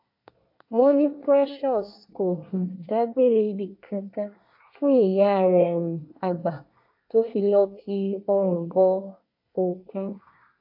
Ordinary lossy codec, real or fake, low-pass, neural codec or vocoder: AAC, 48 kbps; fake; 5.4 kHz; codec, 24 kHz, 1 kbps, SNAC